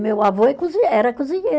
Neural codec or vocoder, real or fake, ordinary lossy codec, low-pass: none; real; none; none